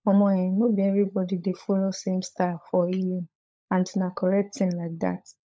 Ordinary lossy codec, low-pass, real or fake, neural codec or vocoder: none; none; fake; codec, 16 kHz, 16 kbps, FunCodec, trained on LibriTTS, 50 frames a second